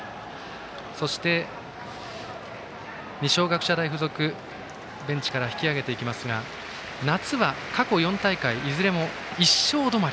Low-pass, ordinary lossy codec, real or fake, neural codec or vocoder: none; none; real; none